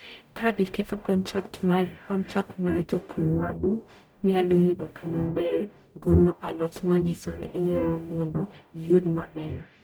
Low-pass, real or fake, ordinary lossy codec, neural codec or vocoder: none; fake; none; codec, 44.1 kHz, 0.9 kbps, DAC